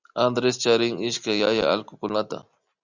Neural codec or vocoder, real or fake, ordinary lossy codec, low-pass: none; real; Opus, 64 kbps; 7.2 kHz